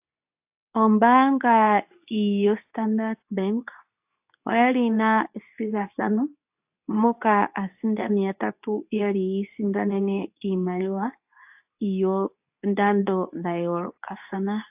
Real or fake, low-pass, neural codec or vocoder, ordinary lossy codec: fake; 3.6 kHz; codec, 24 kHz, 0.9 kbps, WavTokenizer, medium speech release version 2; AAC, 32 kbps